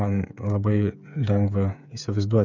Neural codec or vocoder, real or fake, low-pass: codec, 16 kHz, 8 kbps, FreqCodec, smaller model; fake; 7.2 kHz